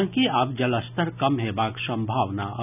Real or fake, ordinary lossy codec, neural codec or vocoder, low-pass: real; none; none; 3.6 kHz